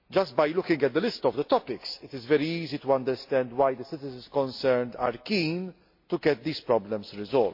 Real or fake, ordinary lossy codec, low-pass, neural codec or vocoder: real; MP3, 32 kbps; 5.4 kHz; none